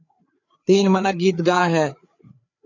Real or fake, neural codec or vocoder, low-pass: fake; codec, 16 kHz, 4 kbps, FreqCodec, larger model; 7.2 kHz